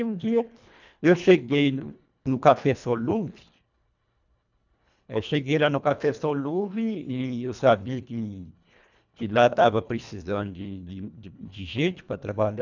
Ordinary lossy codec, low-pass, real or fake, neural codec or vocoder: none; 7.2 kHz; fake; codec, 24 kHz, 1.5 kbps, HILCodec